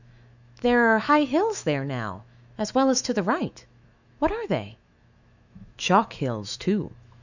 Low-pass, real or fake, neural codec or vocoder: 7.2 kHz; fake; autoencoder, 48 kHz, 128 numbers a frame, DAC-VAE, trained on Japanese speech